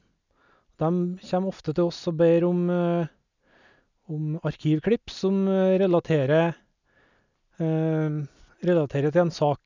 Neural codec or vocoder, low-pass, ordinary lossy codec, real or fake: none; 7.2 kHz; none; real